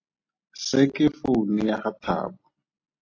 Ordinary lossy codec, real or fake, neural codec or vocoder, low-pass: AAC, 32 kbps; real; none; 7.2 kHz